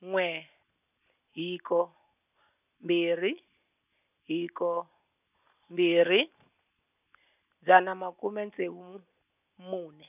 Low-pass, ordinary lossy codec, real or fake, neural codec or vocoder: 3.6 kHz; none; real; none